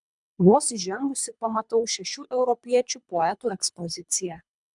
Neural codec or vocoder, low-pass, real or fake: codec, 24 kHz, 3 kbps, HILCodec; 10.8 kHz; fake